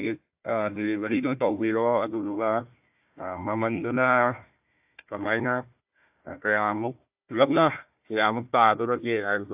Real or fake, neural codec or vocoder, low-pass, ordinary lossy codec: fake; codec, 16 kHz, 1 kbps, FunCodec, trained on Chinese and English, 50 frames a second; 3.6 kHz; AAC, 32 kbps